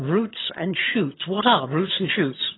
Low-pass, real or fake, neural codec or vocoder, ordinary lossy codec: 7.2 kHz; fake; vocoder, 22.05 kHz, 80 mel bands, HiFi-GAN; AAC, 16 kbps